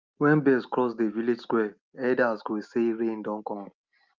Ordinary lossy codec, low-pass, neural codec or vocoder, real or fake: Opus, 24 kbps; 7.2 kHz; none; real